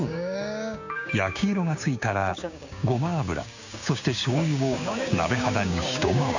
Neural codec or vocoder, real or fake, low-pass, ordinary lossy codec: codec, 16 kHz, 6 kbps, DAC; fake; 7.2 kHz; none